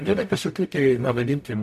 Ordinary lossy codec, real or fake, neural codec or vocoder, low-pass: MP3, 64 kbps; fake; codec, 44.1 kHz, 0.9 kbps, DAC; 14.4 kHz